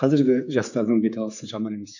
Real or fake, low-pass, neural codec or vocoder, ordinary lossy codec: fake; 7.2 kHz; codec, 16 kHz, 4 kbps, X-Codec, HuBERT features, trained on general audio; none